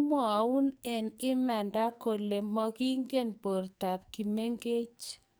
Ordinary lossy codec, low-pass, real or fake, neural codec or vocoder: none; none; fake; codec, 44.1 kHz, 2.6 kbps, SNAC